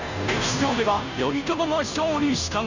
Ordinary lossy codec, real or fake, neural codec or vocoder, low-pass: none; fake; codec, 16 kHz, 0.5 kbps, FunCodec, trained on Chinese and English, 25 frames a second; 7.2 kHz